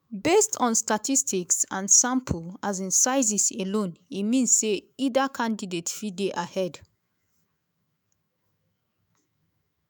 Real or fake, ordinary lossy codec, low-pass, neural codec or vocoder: fake; none; none; autoencoder, 48 kHz, 128 numbers a frame, DAC-VAE, trained on Japanese speech